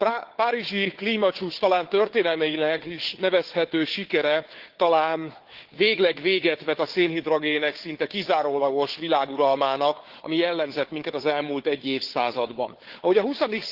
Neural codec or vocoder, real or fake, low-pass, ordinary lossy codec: codec, 16 kHz, 4 kbps, FunCodec, trained on Chinese and English, 50 frames a second; fake; 5.4 kHz; Opus, 16 kbps